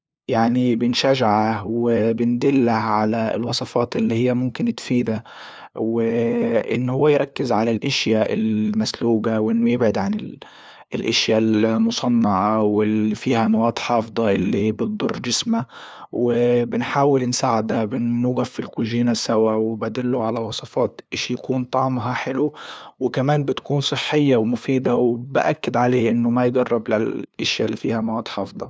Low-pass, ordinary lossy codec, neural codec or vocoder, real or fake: none; none; codec, 16 kHz, 2 kbps, FunCodec, trained on LibriTTS, 25 frames a second; fake